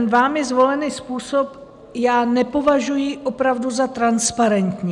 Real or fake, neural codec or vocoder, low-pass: real; none; 10.8 kHz